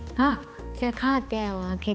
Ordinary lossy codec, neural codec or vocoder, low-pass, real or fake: none; codec, 16 kHz, 2 kbps, X-Codec, HuBERT features, trained on balanced general audio; none; fake